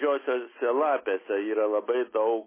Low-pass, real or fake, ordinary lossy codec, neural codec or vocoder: 3.6 kHz; real; MP3, 16 kbps; none